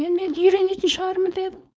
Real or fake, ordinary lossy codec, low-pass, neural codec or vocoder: fake; none; none; codec, 16 kHz, 4.8 kbps, FACodec